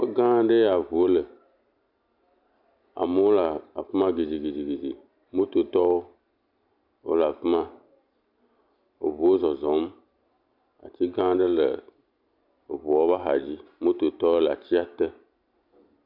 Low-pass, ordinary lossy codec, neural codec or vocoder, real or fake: 5.4 kHz; AAC, 48 kbps; none; real